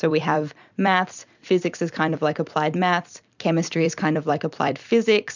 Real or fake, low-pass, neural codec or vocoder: fake; 7.2 kHz; vocoder, 44.1 kHz, 128 mel bands every 256 samples, BigVGAN v2